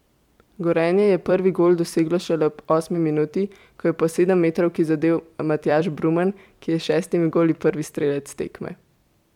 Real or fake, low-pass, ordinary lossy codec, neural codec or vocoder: fake; 19.8 kHz; MP3, 96 kbps; vocoder, 44.1 kHz, 128 mel bands every 256 samples, BigVGAN v2